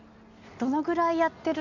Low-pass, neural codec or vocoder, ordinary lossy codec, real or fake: 7.2 kHz; none; none; real